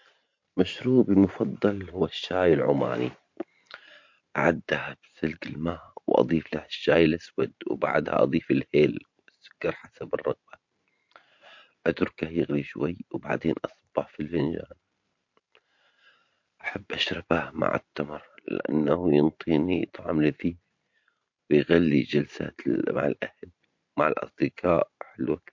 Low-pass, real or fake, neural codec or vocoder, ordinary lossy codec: 7.2 kHz; fake; vocoder, 44.1 kHz, 128 mel bands every 512 samples, BigVGAN v2; MP3, 48 kbps